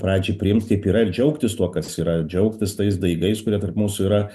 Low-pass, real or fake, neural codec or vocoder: 14.4 kHz; real; none